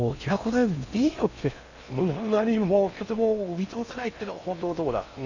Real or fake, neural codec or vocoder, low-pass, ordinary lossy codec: fake; codec, 16 kHz in and 24 kHz out, 0.6 kbps, FocalCodec, streaming, 4096 codes; 7.2 kHz; none